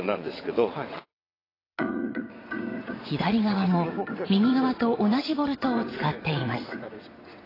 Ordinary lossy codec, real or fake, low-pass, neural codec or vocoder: AAC, 24 kbps; fake; 5.4 kHz; vocoder, 22.05 kHz, 80 mel bands, WaveNeXt